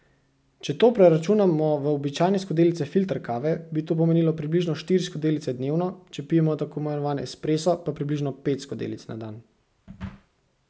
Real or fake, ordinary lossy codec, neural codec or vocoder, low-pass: real; none; none; none